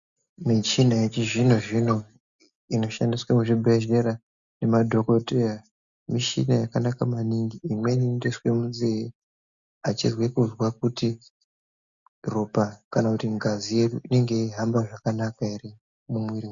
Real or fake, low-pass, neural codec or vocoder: real; 7.2 kHz; none